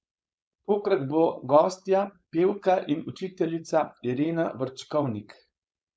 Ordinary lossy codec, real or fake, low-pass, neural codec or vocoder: none; fake; none; codec, 16 kHz, 4.8 kbps, FACodec